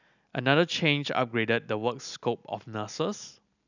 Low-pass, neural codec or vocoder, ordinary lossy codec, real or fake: 7.2 kHz; none; none; real